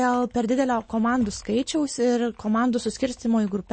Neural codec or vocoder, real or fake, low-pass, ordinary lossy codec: none; real; 9.9 kHz; MP3, 32 kbps